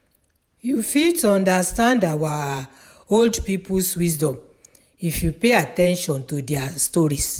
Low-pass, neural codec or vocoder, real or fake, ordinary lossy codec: none; vocoder, 48 kHz, 128 mel bands, Vocos; fake; none